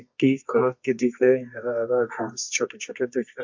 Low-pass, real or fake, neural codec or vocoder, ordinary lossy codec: 7.2 kHz; fake; codec, 24 kHz, 0.9 kbps, WavTokenizer, medium music audio release; MP3, 48 kbps